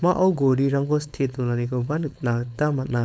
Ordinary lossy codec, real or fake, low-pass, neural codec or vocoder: none; fake; none; codec, 16 kHz, 4.8 kbps, FACodec